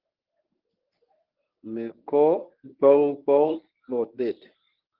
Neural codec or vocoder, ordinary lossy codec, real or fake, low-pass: codec, 24 kHz, 0.9 kbps, WavTokenizer, medium speech release version 1; Opus, 32 kbps; fake; 5.4 kHz